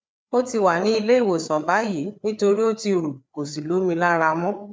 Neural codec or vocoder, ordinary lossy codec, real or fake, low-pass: codec, 16 kHz, 4 kbps, FreqCodec, larger model; none; fake; none